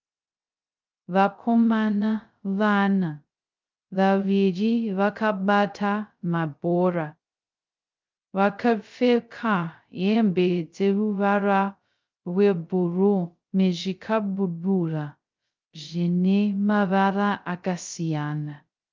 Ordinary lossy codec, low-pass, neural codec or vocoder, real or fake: Opus, 24 kbps; 7.2 kHz; codec, 16 kHz, 0.2 kbps, FocalCodec; fake